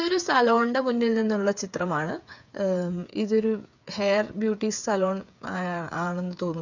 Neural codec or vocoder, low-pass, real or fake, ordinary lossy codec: codec, 16 kHz, 8 kbps, FreqCodec, smaller model; 7.2 kHz; fake; none